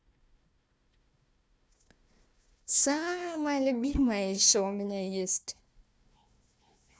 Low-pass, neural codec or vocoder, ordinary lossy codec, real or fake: none; codec, 16 kHz, 1 kbps, FunCodec, trained on Chinese and English, 50 frames a second; none; fake